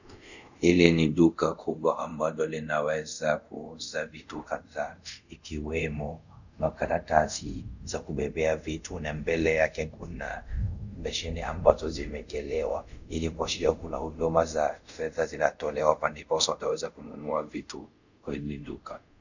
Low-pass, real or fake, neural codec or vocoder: 7.2 kHz; fake; codec, 24 kHz, 0.5 kbps, DualCodec